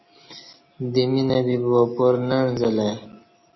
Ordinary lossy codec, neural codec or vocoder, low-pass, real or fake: MP3, 24 kbps; none; 7.2 kHz; real